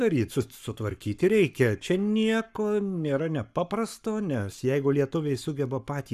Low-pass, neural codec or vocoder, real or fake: 14.4 kHz; codec, 44.1 kHz, 7.8 kbps, Pupu-Codec; fake